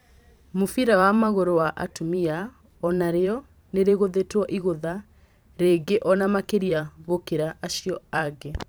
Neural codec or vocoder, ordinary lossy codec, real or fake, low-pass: vocoder, 44.1 kHz, 128 mel bands every 512 samples, BigVGAN v2; none; fake; none